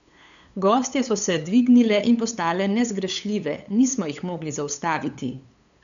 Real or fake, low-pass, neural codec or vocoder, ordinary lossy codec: fake; 7.2 kHz; codec, 16 kHz, 8 kbps, FunCodec, trained on LibriTTS, 25 frames a second; none